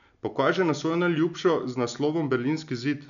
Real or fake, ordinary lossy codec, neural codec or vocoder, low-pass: real; none; none; 7.2 kHz